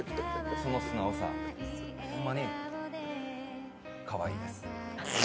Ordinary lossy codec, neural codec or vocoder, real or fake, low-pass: none; none; real; none